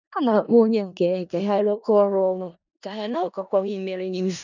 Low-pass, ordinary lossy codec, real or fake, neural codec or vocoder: 7.2 kHz; none; fake; codec, 16 kHz in and 24 kHz out, 0.4 kbps, LongCat-Audio-Codec, four codebook decoder